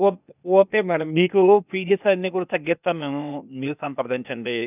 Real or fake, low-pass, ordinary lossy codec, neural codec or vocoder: fake; 3.6 kHz; none; codec, 16 kHz, 0.8 kbps, ZipCodec